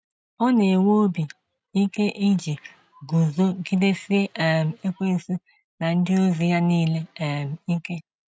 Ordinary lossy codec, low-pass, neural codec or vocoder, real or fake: none; none; none; real